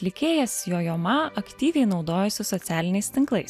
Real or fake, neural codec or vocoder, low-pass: real; none; 14.4 kHz